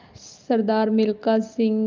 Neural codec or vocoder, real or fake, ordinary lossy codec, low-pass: none; real; Opus, 24 kbps; 7.2 kHz